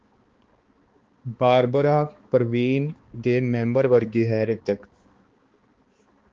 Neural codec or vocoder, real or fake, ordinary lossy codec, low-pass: codec, 16 kHz, 2 kbps, X-Codec, HuBERT features, trained on balanced general audio; fake; Opus, 16 kbps; 7.2 kHz